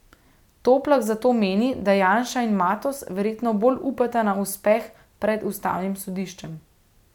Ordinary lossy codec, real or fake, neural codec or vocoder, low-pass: none; real; none; 19.8 kHz